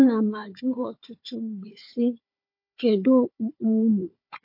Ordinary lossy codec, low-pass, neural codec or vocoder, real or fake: MP3, 32 kbps; 5.4 kHz; codec, 16 kHz, 4 kbps, FunCodec, trained on Chinese and English, 50 frames a second; fake